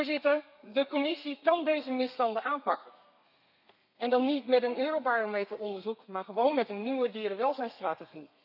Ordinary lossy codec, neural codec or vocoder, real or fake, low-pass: none; codec, 32 kHz, 1.9 kbps, SNAC; fake; 5.4 kHz